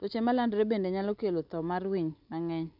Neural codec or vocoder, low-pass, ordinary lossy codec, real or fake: none; 5.4 kHz; none; real